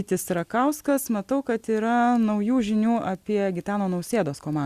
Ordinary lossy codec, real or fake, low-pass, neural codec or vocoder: Opus, 64 kbps; real; 14.4 kHz; none